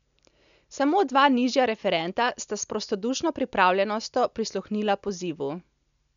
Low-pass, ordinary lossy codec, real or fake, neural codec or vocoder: 7.2 kHz; none; real; none